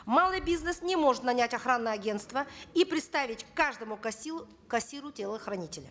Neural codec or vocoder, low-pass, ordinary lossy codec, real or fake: none; none; none; real